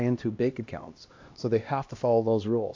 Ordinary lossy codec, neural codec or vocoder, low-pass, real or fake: AAC, 48 kbps; codec, 16 kHz, 1 kbps, X-Codec, HuBERT features, trained on LibriSpeech; 7.2 kHz; fake